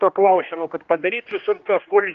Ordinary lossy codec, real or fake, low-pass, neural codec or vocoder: Opus, 32 kbps; fake; 7.2 kHz; codec, 16 kHz, 1 kbps, X-Codec, HuBERT features, trained on general audio